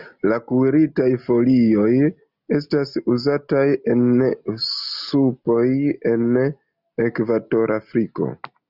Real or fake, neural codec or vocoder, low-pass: real; none; 5.4 kHz